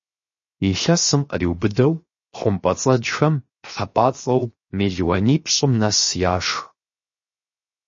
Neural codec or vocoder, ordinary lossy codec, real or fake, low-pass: codec, 16 kHz, 0.7 kbps, FocalCodec; MP3, 32 kbps; fake; 7.2 kHz